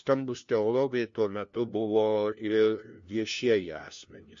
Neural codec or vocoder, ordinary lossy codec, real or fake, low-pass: codec, 16 kHz, 1 kbps, FunCodec, trained on LibriTTS, 50 frames a second; MP3, 48 kbps; fake; 7.2 kHz